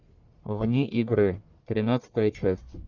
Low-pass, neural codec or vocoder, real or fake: 7.2 kHz; codec, 44.1 kHz, 1.7 kbps, Pupu-Codec; fake